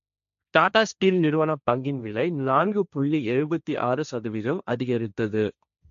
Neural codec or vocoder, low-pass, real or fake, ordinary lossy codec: codec, 16 kHz, 1.1 kbps, Voila-Tokenizer; 7.2 kHz; fake; none